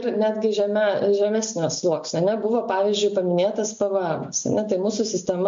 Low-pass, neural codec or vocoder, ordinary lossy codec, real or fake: 7.2 kHz; none; MP3, 48 kbps; real